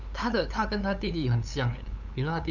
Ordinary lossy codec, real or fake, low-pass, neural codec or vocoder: none; fake; 7.2 kHz; codec, 16 kHz, 8 kbps, FunCodec, trained on LibriTTS, 25 frames a second